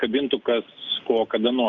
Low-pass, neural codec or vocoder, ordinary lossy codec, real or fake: 7.2 kHz; none; Opus, 32 kbps; real